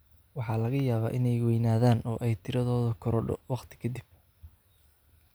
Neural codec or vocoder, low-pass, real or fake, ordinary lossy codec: none; none; real; none